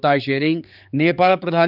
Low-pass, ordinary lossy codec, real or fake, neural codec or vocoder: 5.4 kHz; none; fake; codec, 16 kHz, 4 kbps, X-Codec, HuBERT features, trained on general audio